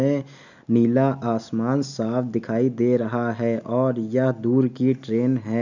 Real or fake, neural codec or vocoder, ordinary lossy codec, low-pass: real; none; none; 7.2 kHz